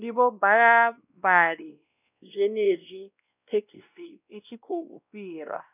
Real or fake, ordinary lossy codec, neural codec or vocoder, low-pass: fake; none; codec, 16 kHz, 1 kbps, X-Codec, WavLM features, trained on Multilingual LibriSpeech; 3.6 kHz